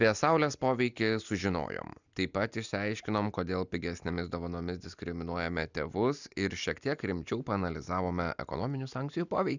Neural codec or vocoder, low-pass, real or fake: none; 7.2 kHz; real